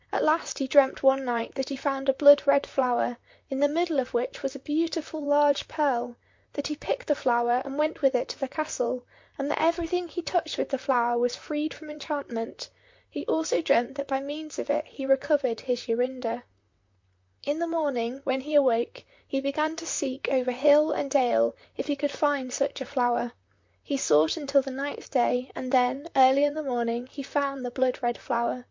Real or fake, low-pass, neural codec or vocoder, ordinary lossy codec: fake; 7.2 kHz; vocoder, 44.1 kHz, 128 mel bands, Pupu-Vocoder; MP3, 48 kbps